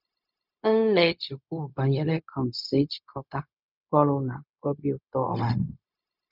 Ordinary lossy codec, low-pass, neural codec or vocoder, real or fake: none; 5.4 kHz; codec, 16 kHz, 0.4 kbps, LongCat-Audio-Codec; fake